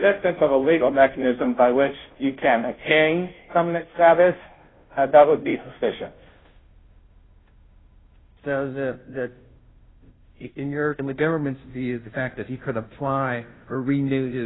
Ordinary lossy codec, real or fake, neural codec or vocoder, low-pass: AAC, 16 kbps; fake; codec, 16 kHz, 0.5 kbps, FunCodec, trained on Chinese and English, 25 frames a second; 7.2 kHz